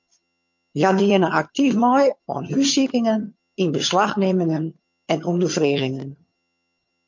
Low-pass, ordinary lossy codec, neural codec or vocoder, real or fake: 7.2 kHz; MP3, 48 kbps; vocoder, 22.05 kHz, 80 mel bands, HiFi-GAN; fake